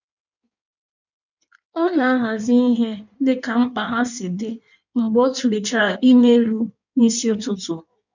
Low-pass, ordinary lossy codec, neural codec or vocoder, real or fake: 7.2 kHz; none; codec, 16 kHz in and 24 kHz out, 1.1 kbps, FireRedTTS-2 codec; fake